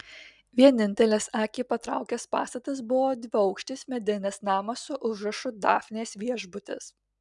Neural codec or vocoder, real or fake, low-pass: none; real; 10.8 kHz